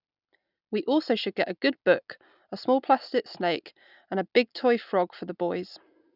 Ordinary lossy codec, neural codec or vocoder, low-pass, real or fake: none; none; 5.4 kHz; real